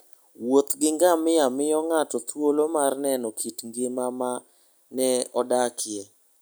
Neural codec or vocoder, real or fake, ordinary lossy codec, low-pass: none; real; none; none